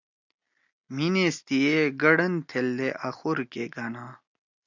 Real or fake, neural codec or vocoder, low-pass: real; none; 7.2 kHz